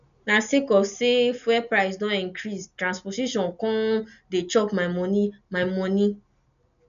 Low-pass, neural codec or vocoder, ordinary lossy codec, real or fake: 7.2 kHz; none; none; real